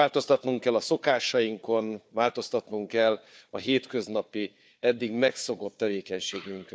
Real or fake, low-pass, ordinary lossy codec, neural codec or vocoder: fake; none; none; codec, 16 kHz, 4 kbps, FunCodec, trained on Chinese and English, 50 frames a second